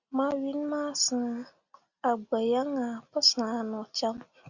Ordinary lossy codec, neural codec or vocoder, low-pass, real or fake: Opus, 64 kbps; none; 7.2 kHz; real